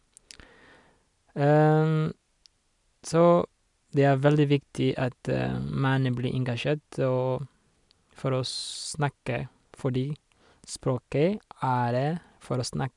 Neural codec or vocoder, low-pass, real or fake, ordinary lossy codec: none; 10.8 kHz; real; none